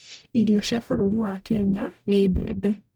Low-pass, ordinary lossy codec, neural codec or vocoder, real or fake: none; none; codec, 44.1 kHz, 0.9 kbps, DAC; fake